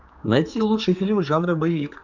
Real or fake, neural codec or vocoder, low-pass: fake; codec, 16 kHz, 4 kbps, X-Codec, HuBERT features, trained on general audio; 7.2 kHz